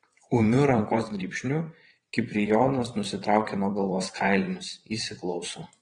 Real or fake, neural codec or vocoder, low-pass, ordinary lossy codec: fake; vocoder, 22.05 kHz, 80 mel bands, WaveNeXt; 9.9 kHz; AAC, 32 kbps